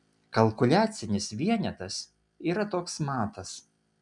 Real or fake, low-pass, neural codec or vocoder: real; 10.8 kHz; none